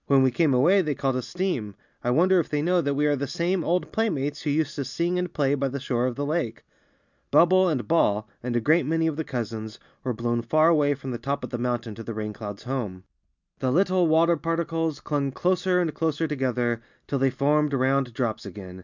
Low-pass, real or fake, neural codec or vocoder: 7.2 kHz; real; none